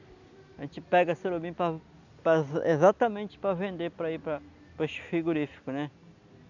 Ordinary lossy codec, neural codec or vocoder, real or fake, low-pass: none; none; real; 7.2 kHz